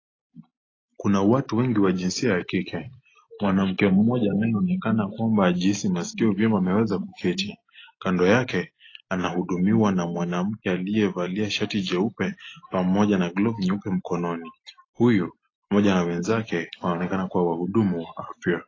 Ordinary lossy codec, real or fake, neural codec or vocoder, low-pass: AAC, 32 kbps; real; none; 7.2 kHz